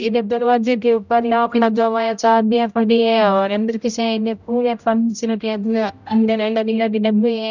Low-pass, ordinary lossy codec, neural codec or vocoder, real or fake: 7.2 kHz; none; codec, 16 kHz, 0.5 kbps, X-Codec, HuBERT features, trained on general audio; fake